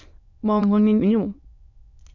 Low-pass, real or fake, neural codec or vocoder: 7.2 kHz; fake; autoencoder, 22.05 kHz, a latent of 192 numbers a frame, VITS, trained on many speakers